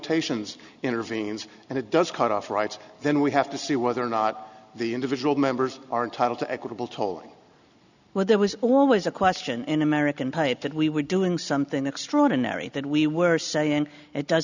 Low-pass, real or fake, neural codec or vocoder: 7.2 kHz; real; none